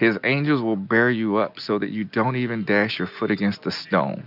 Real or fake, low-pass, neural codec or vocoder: real; 5.4 kHz; none